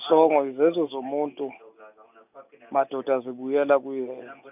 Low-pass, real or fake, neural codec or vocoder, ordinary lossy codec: 3.6 kHz; real; none; none